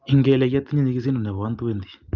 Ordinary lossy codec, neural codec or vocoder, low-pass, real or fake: Opus, 24 kbps; none; 7.2 kHz; real